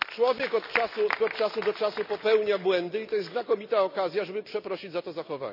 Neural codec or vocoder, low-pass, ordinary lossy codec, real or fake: none; 5.4 kHz; none; real